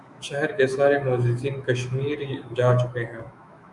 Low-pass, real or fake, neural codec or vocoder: 10.8 kHz; fake; autoencoder, 48 kHz, 128 numbers a frame, DAC-VAE, trained on Japanese speech